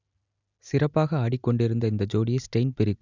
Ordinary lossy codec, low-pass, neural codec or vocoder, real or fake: none; 7.2 kHz; none; real